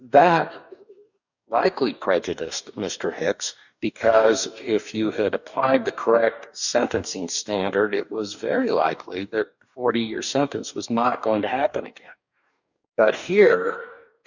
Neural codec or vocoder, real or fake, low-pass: codec, 44.1 kHz, 2.6 kbps, DAC; fake; 7.2 kHz